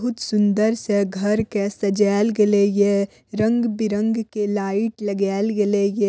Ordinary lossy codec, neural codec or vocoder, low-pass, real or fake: none; none; none; real